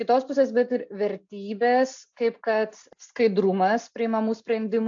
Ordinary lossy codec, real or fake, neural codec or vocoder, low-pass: AAC, 64 kbps; real; none; 7.2 kHz